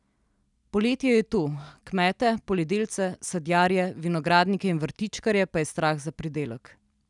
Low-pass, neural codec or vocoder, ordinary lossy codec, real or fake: 10.8 kHz; none; none; real